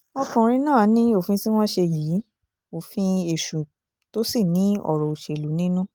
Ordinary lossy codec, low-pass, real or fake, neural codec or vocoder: Opus, 24 kbps; 19.8 kHz; real; none